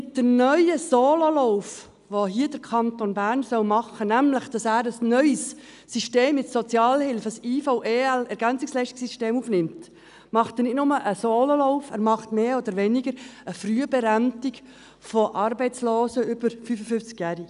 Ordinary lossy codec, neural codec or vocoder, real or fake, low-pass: none; none; real; 10.8 kHz